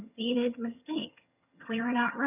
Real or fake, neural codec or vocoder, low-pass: fake; vocoder, 22.05 kHz, 80 mel bands, HiFi-GAN; 3.6 kHz